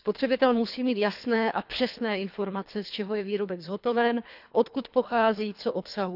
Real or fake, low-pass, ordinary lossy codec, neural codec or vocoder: fake; 5.4 kHz; none; codec, 24 kHz, 3 kbps, HILCodec